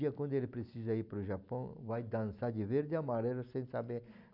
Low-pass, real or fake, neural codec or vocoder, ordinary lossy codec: 5.4 kHz; real; none; none